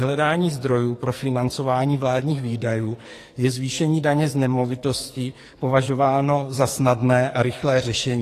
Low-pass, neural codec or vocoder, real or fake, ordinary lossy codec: 14.4 kHz; codec, 44.1 kHz, 2.6 kbps, SNAC; fake; AAC, 48 kbps